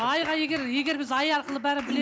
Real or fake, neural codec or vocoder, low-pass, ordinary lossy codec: real; none; none; none